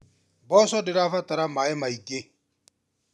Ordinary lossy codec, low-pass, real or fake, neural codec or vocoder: none; none; real; none